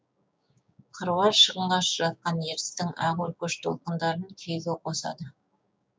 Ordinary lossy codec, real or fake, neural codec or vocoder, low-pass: none; fake; codec, 16 kHz, 6 kbps, DAC; none